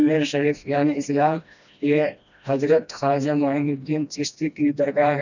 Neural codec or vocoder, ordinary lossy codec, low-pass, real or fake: codec, 16 kHz, 1 kbps, FreqCodec, smaller model; none; 7.2 kHz; fake